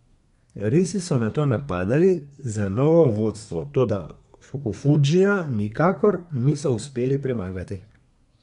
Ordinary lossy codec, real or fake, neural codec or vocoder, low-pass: none; fake; codec, 24 kHz, 1 kbps, SNAC; 10.8 kHz